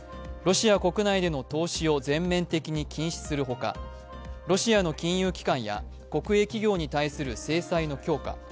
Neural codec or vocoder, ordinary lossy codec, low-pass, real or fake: none; none; none; real